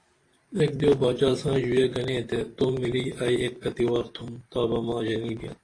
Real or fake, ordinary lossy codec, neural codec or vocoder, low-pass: fake; AAC, 32 kbps; vocoder, 44.1 kHz, 128 mel bands every 512 samples, BigVGAN v2; 9.9 kHz